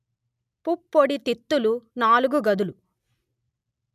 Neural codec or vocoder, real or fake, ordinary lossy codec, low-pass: none; real; none; 14.4 kHz